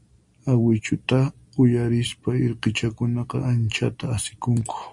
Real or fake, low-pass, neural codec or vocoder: real; 10.8 kHz; none